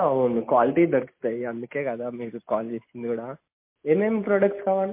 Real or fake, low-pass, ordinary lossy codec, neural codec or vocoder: real; 3.6 kHz; MP3, 24 kbps; none